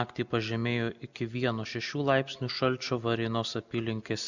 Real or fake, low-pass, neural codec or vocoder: real; 7.2 kHz; none